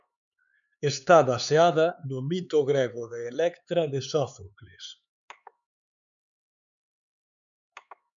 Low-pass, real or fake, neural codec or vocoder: 7.2 kHz; fake; codec, 16 kHz, 4 kbps, X-Codec, HuBERT features, trained on LibriSpeech